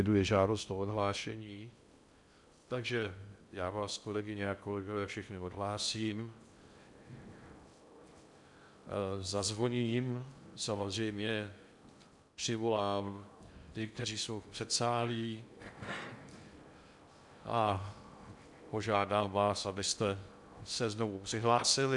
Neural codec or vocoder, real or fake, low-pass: codec, 16 kHz in and 24 kHz out, 0.6 kbps, FocalCodec, streaming, 2048 codes; fake; 10.8 kHz